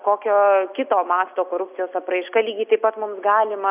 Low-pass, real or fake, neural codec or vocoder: 3.6 kHz; real; none